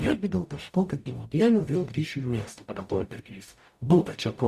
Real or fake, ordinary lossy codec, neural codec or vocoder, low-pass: fake; Opus, 64 kbps; codec, 44.1 kHz, 0.9 kbps, DAC; 14.4 kHz